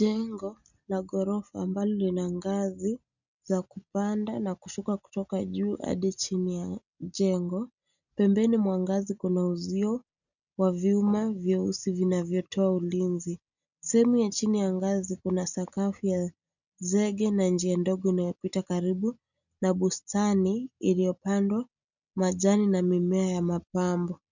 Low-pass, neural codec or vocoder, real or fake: 7.2 kHz; none; real